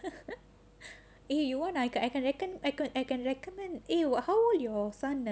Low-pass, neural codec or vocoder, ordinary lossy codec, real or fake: none; none; none; real